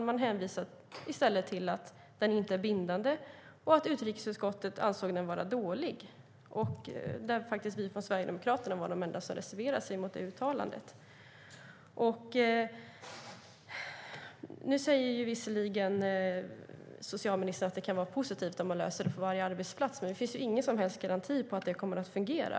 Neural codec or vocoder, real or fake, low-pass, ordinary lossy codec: none; real; none; none